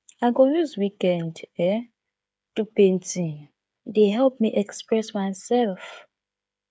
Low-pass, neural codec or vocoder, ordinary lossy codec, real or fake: none; codec, 16 kHz, 8 kbps, FreqCodec, smaller model; none; fake